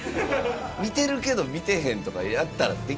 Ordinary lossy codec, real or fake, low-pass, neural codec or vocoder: none; real; none; none